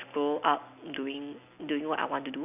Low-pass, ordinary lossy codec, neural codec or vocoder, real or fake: 3.6 kHz; none; none; real